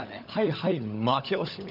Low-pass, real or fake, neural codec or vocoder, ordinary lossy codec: 5.4 kHz; fake; codec, 16 kHz, 16 kbps, FunCodec, trained on LibriTTS, 50 frames a second; none